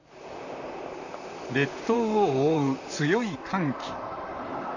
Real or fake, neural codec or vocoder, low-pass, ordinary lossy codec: fake; vocoder, 44.1 kHz, 128 mel bands, Pupu-Vocoder; 7.2 kHz; none